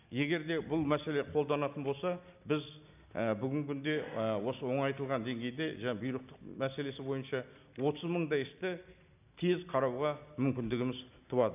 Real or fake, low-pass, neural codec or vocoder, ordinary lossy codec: real; 3.6 kHz; none; none